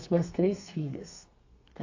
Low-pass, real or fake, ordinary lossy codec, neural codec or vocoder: 7.2 kHz; fake; AAC, 48 kbps; codec, 32 kHz, 1.9 kbps, SNAC